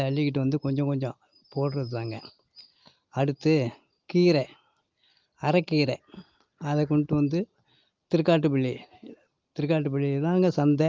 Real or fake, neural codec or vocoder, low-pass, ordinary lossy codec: real; none; 7.2 kHz; Opus, 32 kbps